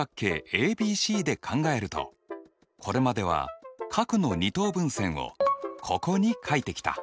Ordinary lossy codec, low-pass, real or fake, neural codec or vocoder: none; none; real; none